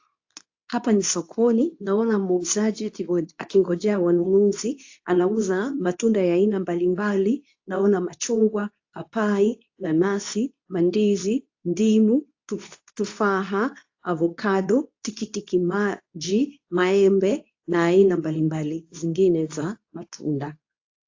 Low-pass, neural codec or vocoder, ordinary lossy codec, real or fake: 7.2 kHz; codec, 24 kHz, 0.9 kbps, WavTokenizer, medium speech release version 2; AAC, 48 kbps; fake